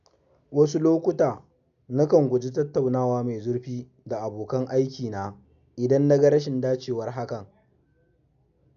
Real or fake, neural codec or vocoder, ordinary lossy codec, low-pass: real; none; none; 7.2 kHz